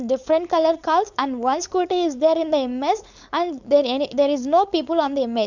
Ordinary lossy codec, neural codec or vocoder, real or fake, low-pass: none; codec, 16 kHz, 4.8 kbps, FACodec; fake; 7.2 kHz